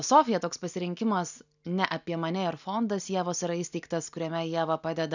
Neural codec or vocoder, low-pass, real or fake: none; 7.2 kHz; real